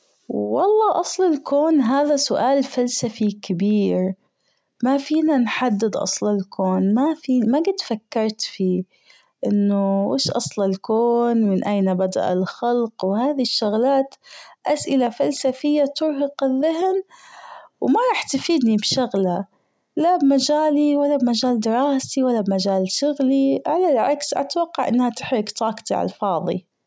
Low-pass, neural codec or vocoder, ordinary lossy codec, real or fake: none; none; none; real